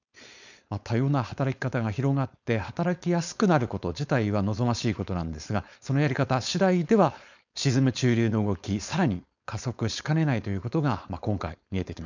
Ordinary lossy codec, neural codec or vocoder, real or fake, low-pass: none; codec, 16 kHz, 4.8 kbps, FACodec; fake; 7.2 kHz